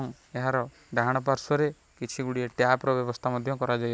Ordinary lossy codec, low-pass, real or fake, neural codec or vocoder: none; none; real; none